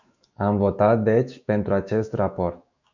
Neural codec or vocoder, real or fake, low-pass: autoencoder, 48 kHz, 128 numbers a frame, DAC-VAE, trained on Japanese speech; fake; 7.2 kHz